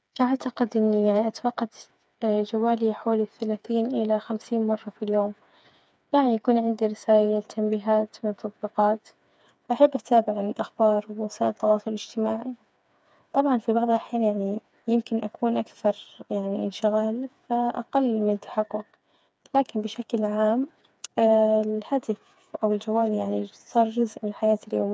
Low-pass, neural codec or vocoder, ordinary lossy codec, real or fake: none; codec, 16 kHz, 4 kbps, FreqCodec, smaller model; none; fake